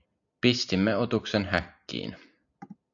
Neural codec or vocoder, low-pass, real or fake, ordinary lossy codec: none; 7.2 kHz; real; AAC, 64 kbps